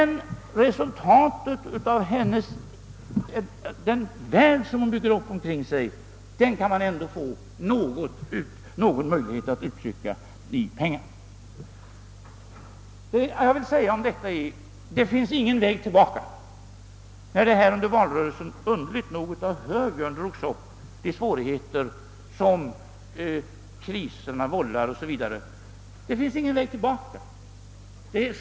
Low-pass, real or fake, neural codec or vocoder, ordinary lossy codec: none; real; none; none